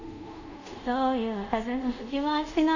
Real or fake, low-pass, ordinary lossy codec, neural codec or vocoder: fake; 7.2 kHz; none; codec, 24 kHz, 0.5 kbps, DualCodec